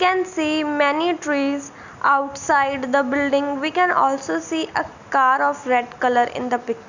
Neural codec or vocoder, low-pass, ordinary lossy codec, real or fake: none; 7.2 kHz; none; real